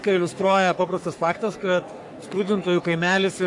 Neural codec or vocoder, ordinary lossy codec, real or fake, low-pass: codec, 44.1 kHz, 3.4 kbps, Pupu-Codec; AAC, 64 kbps; fake; 10.8 kHz